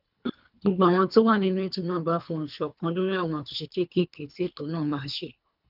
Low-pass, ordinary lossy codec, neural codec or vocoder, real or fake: 5.4 kHz; none; codec, 24 kHz, 3 kbps, HILCodec; fake